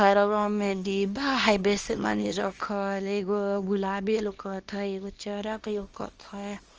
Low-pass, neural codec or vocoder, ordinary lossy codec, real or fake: 7.2 kHz; codec, 24 kHz, 0.9 kbps, WavTokenizer, small release; Opus, 24 kbps; fake